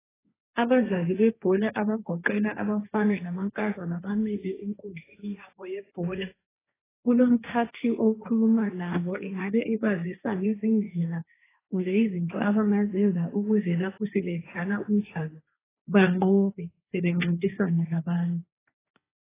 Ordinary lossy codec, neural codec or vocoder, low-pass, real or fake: AAC, 16 kbps; codec, 16 kHz, 1.1 kbps, Voila-Tokenizer; 3.6 kHz; fake